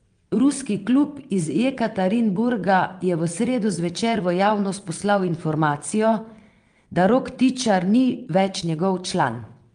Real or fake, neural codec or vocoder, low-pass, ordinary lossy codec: fake; vocoder, 22.05 kHz, 80 mel bands, WaveNeXt; 9.9 kHz; Opus, 32 kbps